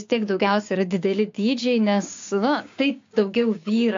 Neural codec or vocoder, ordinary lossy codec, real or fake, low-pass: codec, 16 kHz, 6 kbps, DAC; AAC, 48 kbps; fake; 7.2 kHz